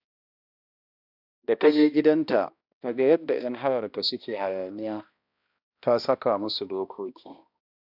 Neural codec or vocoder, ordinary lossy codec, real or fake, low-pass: codec, 16 kHz, 1 kbps, X-Codec, HuBERT features, trained on balanced general audio; AAC, 48 kbps; fake; 5.4 kHz